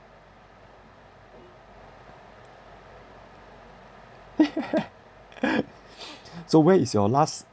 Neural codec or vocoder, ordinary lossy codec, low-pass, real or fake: none; none; none; real